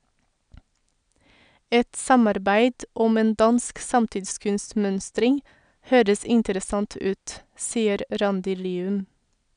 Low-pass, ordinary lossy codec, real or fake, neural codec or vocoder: 9.9 kHz; none; real; none